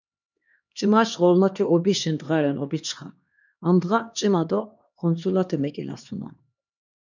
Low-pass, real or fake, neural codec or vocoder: 7.2 kHz; fake; codec, 16 kHz, 2 kbps, X-Codec, HuBERT features, trained on LibriSpeech